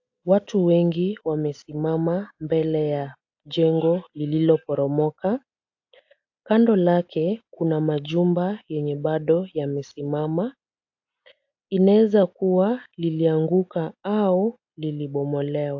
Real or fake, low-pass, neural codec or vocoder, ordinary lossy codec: real; 7.2 kHz; none; AAC, 48 kbps